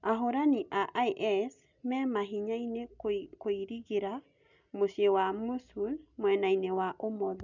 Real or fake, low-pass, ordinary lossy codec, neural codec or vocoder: real; 7.2 kHz; none; none